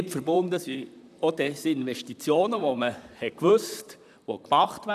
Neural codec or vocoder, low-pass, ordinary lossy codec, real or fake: vocoder, 44.1 kHz, 128 mel bands, Pupu-Vocoder; 14.4 kHz; none; fake